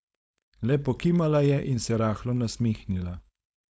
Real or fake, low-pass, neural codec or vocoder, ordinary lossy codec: fake; none; codec, 16 kHz, 4.8 kbps, FACodec; none